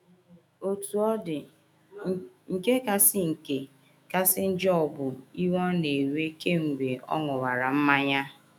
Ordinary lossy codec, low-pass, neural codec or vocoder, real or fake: none; 19.8 kHz; autoencoder, 48 kHz, 128 numbers a frame, DAC-VAE, trained on Japanese speech; fake